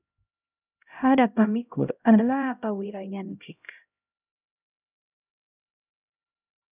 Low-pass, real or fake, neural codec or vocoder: 3.6 kHz; fake; codec, 16 kHz, 0.5 kbps, X-Codec, HuBERT features, trained on LibriSpeech